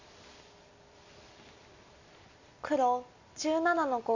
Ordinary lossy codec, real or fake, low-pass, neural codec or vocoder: AAC, 48 kbps; real; 7.2 kHz; none